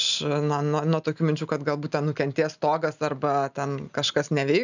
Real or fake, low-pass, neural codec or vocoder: real; 7.2 kHz; none